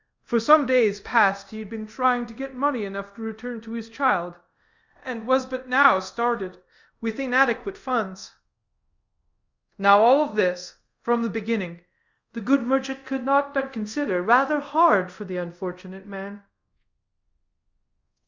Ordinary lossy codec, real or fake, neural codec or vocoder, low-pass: Opus, 64 kbps; fake; codec, 24 kHz, 0.5 kbps, DualCodec; 7.2 kHz